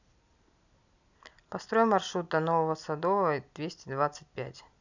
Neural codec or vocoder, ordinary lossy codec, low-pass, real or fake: none; none; 7.2 kHz; real